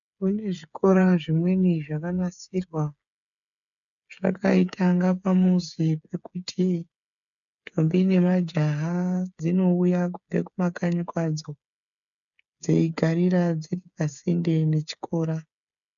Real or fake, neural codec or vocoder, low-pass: fake; codec, 16 kHz, 8 kbps, FreqCodec, smaller model; 7.2 kHz